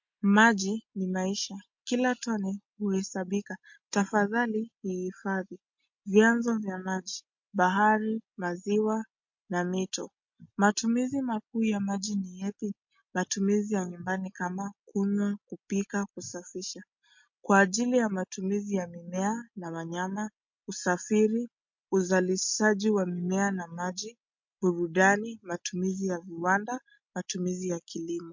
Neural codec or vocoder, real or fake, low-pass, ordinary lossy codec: none; real; 7.2 kHz; MP3, 48 kbps